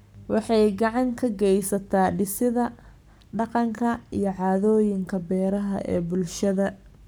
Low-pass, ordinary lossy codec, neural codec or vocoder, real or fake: none; none; codec, 44.1 kHz, 7.8 kbps, Pupu-Codec; fake